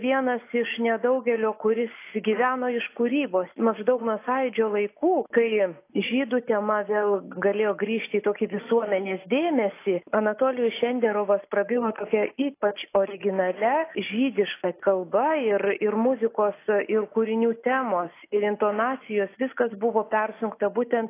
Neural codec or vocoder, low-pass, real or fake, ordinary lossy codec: none; 3.6 kHz; real; AAC, 24 kbps